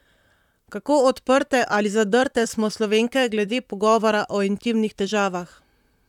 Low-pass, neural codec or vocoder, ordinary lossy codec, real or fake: 19.8 kHz; vocoder, 44.1 kHz, 128 mel bands, Pupu-Vocoder; none; fake